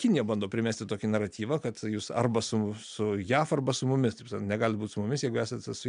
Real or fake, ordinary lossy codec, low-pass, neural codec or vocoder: real; MP3, 96 kbps; 9.9 kHz; none